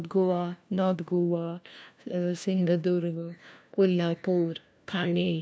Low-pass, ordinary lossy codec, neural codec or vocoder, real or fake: none; none; codec, 16 kHz, 1 kbps, FunCodec, trained on LibriTTS, 50 frames a second; fake